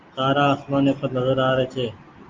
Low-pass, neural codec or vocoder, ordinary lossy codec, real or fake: 7.2 kHz; none; Opus, 24 kbps; real